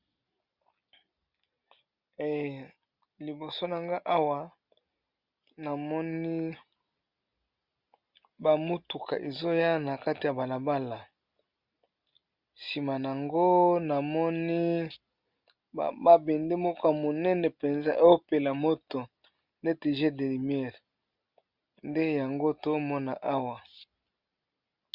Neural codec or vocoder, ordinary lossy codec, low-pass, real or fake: none; Opus, 64 kbps; 5.4 kHz; real